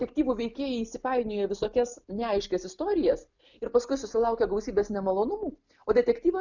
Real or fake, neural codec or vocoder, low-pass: real; none; 7.2 kHz